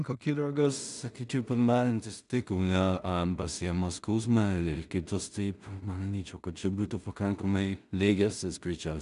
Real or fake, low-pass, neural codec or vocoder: fake; 10.8 kHz; codec, 16 kHz in and 24 kHz out, 0.4 kbps, LongCat-Audio-Codec, two codebook decoder